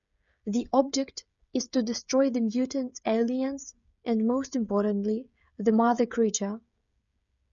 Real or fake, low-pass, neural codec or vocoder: fake; 7.2 kHz; codec, 16 kHz, 16 kbps, FreqCodec, smaller model